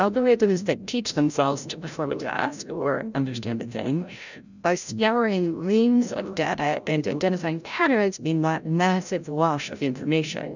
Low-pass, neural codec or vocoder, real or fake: 7.2 kHz; codec, 16 kHz, 0.5 kbps, FreqCodec, larger model; fake